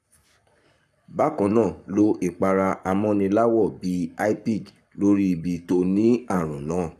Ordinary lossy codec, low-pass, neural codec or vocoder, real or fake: none; 14.4 kHz; codec, 44.1 kHz, 7.8 kbps, Pupu-Codec; fake